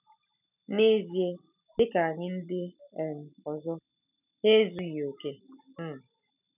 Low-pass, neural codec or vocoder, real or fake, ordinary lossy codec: 3.6 kHz; none; real; none